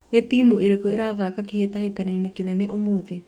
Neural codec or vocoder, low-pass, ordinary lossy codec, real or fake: codec, 44.1 kHz, 2.6 kbps, DAC; 19.8 kHz; none; fake